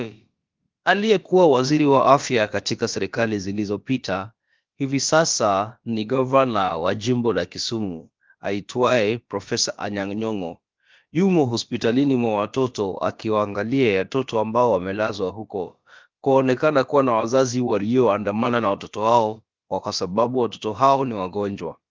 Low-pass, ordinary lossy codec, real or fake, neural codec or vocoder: 7.2 kHz; Opus, 32 kbps; fake; codec, 16 kHz, about 1 kbps, DyCAST, with the encoder's durations